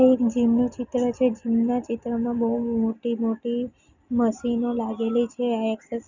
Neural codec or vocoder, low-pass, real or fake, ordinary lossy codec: none; 7.2 kHz; real; none